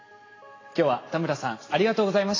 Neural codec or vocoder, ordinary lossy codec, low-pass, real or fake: none; AAC, 32 kbps; 7.2 kHz; real